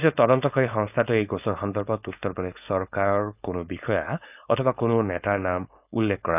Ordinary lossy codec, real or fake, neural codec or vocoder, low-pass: none; fake; codec, 16 kHz, 4.8 kbps, FACodec; 3.6 kHz